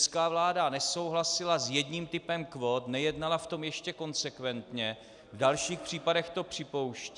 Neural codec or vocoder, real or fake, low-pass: none; real; 10.8 kHz